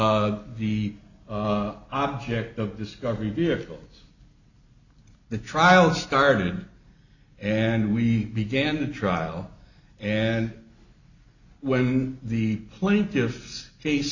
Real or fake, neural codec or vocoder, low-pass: real; none; 7.2 kHz